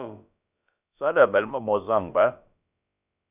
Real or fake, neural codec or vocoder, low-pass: fake; codec, 16 kHz, about 1 kbps, DyCAST, with the encoder's durations; 3.6 kHz